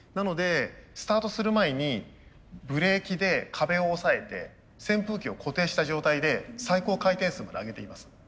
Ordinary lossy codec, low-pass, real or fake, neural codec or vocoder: none; none; real; none